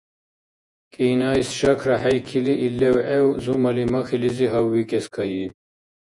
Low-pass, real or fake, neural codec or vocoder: 10.8 kHz; fake; vocoder, 48 kHz, 128 mel bands, Vocos